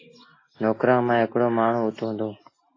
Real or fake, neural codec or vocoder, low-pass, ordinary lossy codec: real; none; 7.2 kHz; AAC, 32 kbps